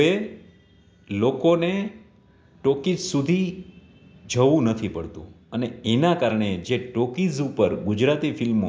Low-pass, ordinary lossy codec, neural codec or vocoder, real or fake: none; none; none; real